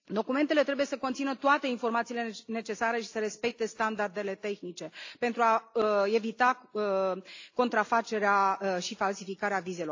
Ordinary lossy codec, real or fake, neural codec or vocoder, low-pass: AAC, 48 kbps; real; none; 7.2 kHz